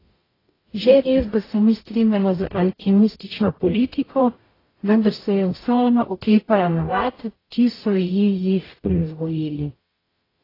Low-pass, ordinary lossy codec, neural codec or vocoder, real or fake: 5.4 kHz; AAC, 24 kbps; codec, 44.1 kHz, 0.9 kbps, DAC; fake